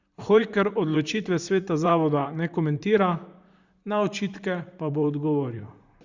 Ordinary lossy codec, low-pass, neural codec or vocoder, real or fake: Opus, 64 kbps; 7.2 kHz; vocoder, 44.1 kHz, 128 mel bands, Pupu-Vocoder; fake